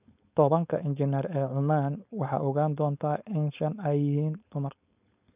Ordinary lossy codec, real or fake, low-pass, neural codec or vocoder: none; fake; 3.6 kHz; codec, 16 kHz, 4.8 kbps, FACodec